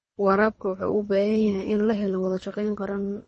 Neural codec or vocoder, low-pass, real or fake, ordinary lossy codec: codec, 24 kHz, 3 kbps, HILCodec; 10.8 kHz; fake; MP3, 32 kbps